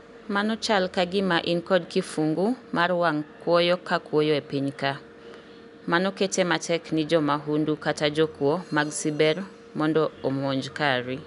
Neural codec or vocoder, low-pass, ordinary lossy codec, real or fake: none; 10.8 kHz; none; real